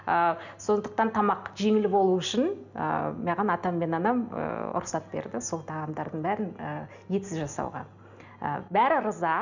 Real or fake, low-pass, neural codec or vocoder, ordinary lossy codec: real; 7.2 kHz; none; none